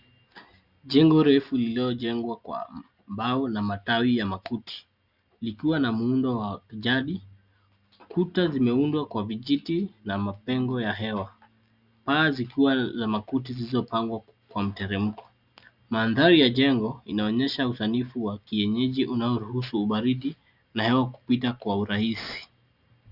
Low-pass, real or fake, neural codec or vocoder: 5.4 kHz; real; none